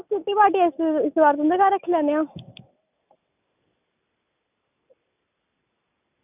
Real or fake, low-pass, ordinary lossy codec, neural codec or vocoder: real; 3.6 kHz; none; none